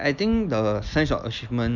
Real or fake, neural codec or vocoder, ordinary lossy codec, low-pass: real; none; none; 7.2 kHz